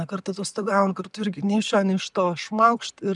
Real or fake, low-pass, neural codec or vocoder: real; 10.8 kHz; none